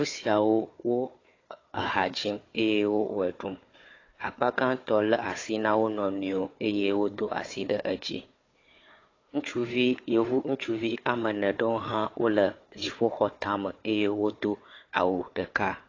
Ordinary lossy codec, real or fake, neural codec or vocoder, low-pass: AAC, 32 kbps; fake; codec, 16 kHz, 4 kbps, FunCodec, trained on Chinese and English, 50 frames a second; 7.2 kHz